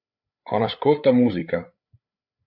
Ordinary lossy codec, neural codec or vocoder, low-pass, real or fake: MP3, 48 kbps; codec, 16 kHz, 8 kbps, FreqCodec, larger model; 5.4 kHz; fake